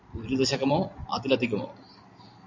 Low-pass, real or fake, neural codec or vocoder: 7.2 kHz; real; none